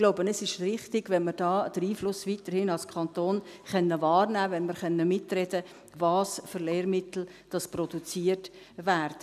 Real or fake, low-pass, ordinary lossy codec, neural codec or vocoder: real; 14.4 kHz; none; none